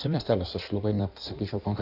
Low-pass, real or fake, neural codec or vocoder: 5.4 kHz; fake; codec, 16 kHz in and 24 kHz out, 1.1 kbps, FireRedTTS-2 codec